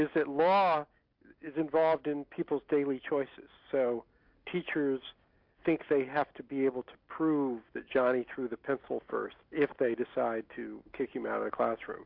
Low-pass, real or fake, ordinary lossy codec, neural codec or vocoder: 5.4 kHz; real; MP3, 48 kbps; none